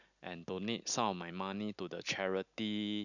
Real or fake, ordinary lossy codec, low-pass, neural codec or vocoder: real; none; 7.2 kHz; none